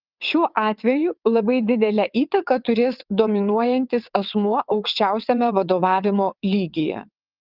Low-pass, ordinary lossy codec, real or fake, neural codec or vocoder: 5.4 kHz; Opus, 32 kbps; fake; vocoder, 22.05 kHz, 80 mel bands, WaveNeXt